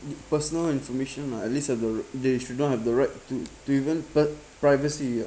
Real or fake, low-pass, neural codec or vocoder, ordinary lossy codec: real; none; none; none